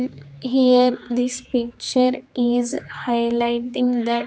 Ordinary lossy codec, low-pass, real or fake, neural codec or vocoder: none; none; fake; codec, 16 kHz, 4 kbps, X-Codec, HuBERT features, trained on general audio